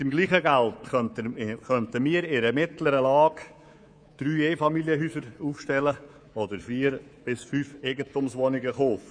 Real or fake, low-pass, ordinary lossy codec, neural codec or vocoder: fake; 9.9 kHz; none; codec, 24 kHz, 3.1 kbps, DualCodec